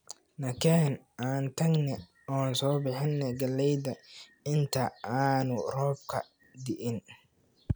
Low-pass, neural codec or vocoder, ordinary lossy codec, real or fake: none; none; none; real